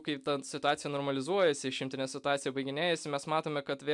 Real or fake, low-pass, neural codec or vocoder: real; 10.8 kHz; none